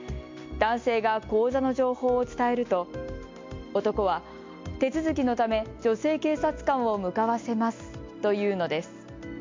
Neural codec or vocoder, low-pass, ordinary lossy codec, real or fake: none; 7.2 kHz; MP3, 48 kbps; real